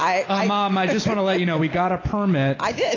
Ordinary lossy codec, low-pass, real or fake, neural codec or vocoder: AAC, 32 kbps; 7.2 kHz; real; none